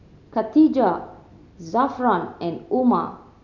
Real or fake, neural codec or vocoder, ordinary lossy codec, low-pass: real; none; none; 7.2 kHz